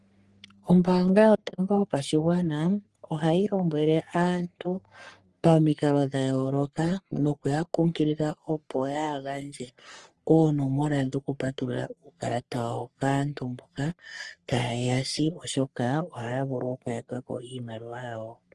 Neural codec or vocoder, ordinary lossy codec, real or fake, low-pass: codec, 44.1 kHz, 3.4 kbps, Pupu-Codec; Opus, 24 kbps; fake; 10.8 kHz